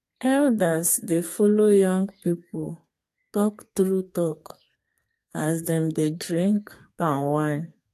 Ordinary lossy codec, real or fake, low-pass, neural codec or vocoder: AAC, 64 kbps; fake; 14.4 kHz; codec, 32 kHz, 1.9 kbps, SNAC